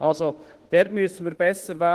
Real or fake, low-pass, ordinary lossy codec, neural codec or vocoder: fake; 14.4 kHz; Opus, 16 kbps; autoencoder, 48 kHz, 32 numbers a frame, DAC-VAE, trained on Japanese speech